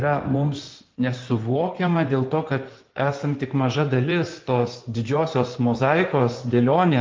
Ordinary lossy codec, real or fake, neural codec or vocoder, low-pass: Opus, 32 kbps; fake; codec, 16 kHz in and 24 kHz out, 2.2 kbps, FireRedTTS-2 codec; 7.2 kHz